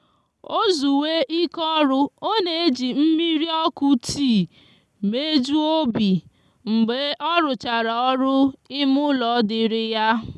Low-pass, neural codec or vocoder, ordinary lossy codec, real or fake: none; none; none; real